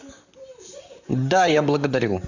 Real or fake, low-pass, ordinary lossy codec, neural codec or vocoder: fake; 7.2 kHz; none; vocoder, 44.1 kHz, 128 mel bands, Pupu-Vocoder